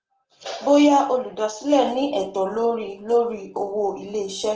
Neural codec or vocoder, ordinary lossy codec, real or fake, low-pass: none; Opus, 16 kbps; real; 7.2 kHz